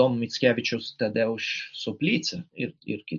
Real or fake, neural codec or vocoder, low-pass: real; none; 7.2 kHz